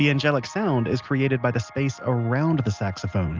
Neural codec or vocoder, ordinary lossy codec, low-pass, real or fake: none; Opus, 24 kbps; 7.2 kHz; real